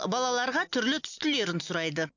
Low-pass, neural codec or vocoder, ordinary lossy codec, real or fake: 7.2 kHz; none; none; real